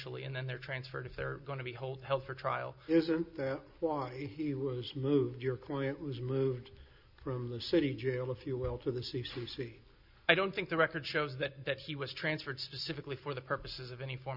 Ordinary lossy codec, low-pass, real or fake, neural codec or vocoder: Opus, 64 kbps; 5.4 kHz; real; none